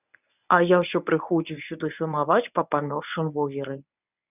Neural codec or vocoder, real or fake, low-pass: codec, 24 kHz, 0.9 kbps, WavTokenizer, medium speech release version 1; fake; 3.6 kHz